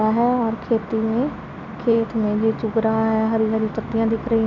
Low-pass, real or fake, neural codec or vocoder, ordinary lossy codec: 7.2 kHz; real; none; none